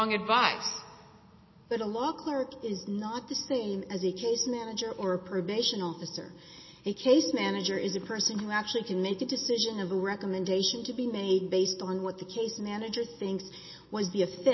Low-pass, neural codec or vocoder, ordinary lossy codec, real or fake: 7.2 kHz; none; MP3, 24 kbps; real